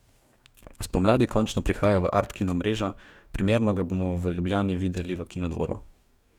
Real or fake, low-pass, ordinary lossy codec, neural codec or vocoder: fake; 19.8 kHz; none; codec, 44.1 kHz, 2.6 kbps, DAC